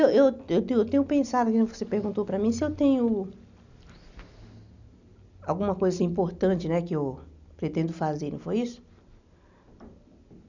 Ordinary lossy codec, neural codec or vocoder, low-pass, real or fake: none; none; 7.2 kHz; real